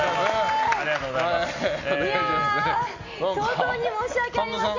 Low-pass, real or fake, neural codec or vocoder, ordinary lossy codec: 7.2 kHz; real; none; MP3, 48 kbps